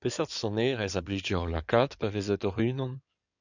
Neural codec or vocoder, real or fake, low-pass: codec, 16 kHz in and 24 kHz out, 2.2 kbps, FireRedTTS-2 codec; fake; 7.2 kHz